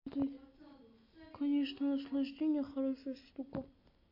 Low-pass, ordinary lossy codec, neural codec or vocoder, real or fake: 5.4 kHz; MP3, 32 kbps; none; real